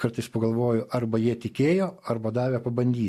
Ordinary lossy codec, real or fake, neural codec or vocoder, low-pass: MP3, 64 kbps; real; none; 14.4 kHz